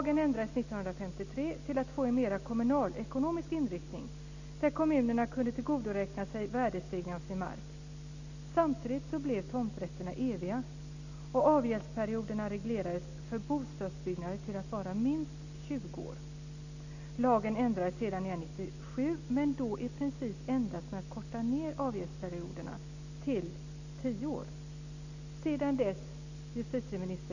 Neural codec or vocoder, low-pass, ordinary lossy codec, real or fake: none; 7.2 kHz; none; real